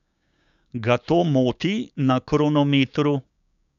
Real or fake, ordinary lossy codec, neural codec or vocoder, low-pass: fake; none; codec, 16 kHz, 6 kbps, DAC; 7.2 kHz